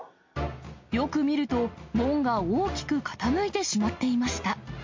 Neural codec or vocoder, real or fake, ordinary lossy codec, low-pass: none; real; MP3, 48 kbps; 7.2 kHz